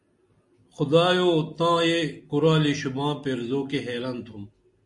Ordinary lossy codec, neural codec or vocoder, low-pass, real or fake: MP3, 48 kbps; none; 10.8 kHz; real